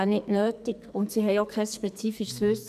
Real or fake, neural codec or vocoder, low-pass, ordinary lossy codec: fake; codec, 44.1 kHz, 2.6 kbps, SNAC; 14.4 kHz; none